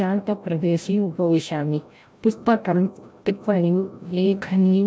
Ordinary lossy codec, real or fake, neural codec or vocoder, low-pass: none; fake; codec, 16 kHz, 0.5 kbps, FreqCodec, larger model; none